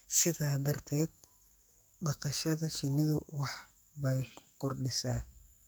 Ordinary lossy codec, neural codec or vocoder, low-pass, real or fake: none; codec, 44.1 kHz, 2.6 kbps, SNAC; none; fake